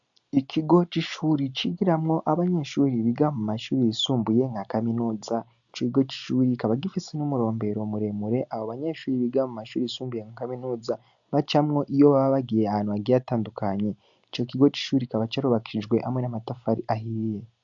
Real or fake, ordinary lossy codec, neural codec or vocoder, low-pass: real; AAC, 64 kbps; none; 7.2 kHz